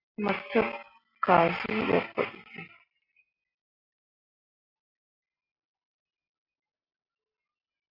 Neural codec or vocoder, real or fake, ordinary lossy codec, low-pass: none; real; AAC, 32 kbps; 5.4 kHz